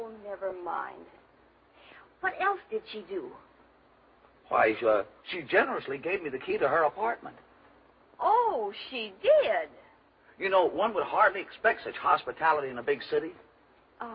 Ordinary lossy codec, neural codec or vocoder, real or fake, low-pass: MP3, 24 kbps; none; real; 7.2 kHz